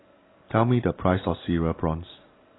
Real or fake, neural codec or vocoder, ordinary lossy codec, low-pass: real; none; AAC, 16 kbps; 7.2 kHz